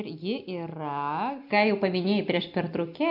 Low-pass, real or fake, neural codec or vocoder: 5.4 kHz; real; none